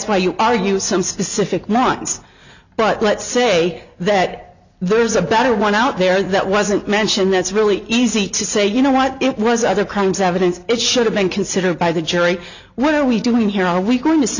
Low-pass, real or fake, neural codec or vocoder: 7.2 kHz; real; none